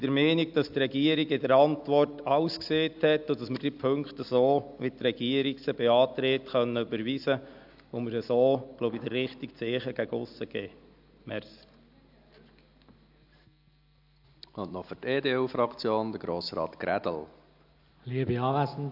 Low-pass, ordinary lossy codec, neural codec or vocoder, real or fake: 5.4 kHz; AAC, 48 kbps; none; real